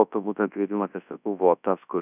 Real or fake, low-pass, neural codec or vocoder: fake; 3.6 kHz; codec, 24 kHz, 0.9 kbps, WavTokenizer, large speech release